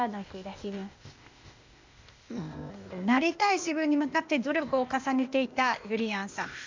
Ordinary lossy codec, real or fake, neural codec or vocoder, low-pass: MP3, 64 kbps; fake; codec, 16 kHz, 0.8 kbps, ZipCodec; 7.2 kHz